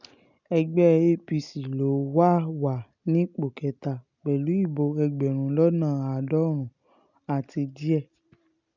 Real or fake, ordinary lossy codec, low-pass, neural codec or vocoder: real; none; 7.2 kHz; none